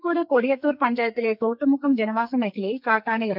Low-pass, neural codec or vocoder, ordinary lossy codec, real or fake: 5.4 kHz; codec, 44.1 kHz, 2.6 kbps, SNAC; none; fake